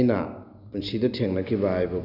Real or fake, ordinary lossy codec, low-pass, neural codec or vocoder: real; none; 5.4 kHz; none